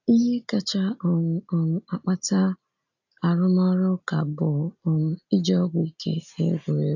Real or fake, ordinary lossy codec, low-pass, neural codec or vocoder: real; AAC, 48 kbps; 7.2 kHz; none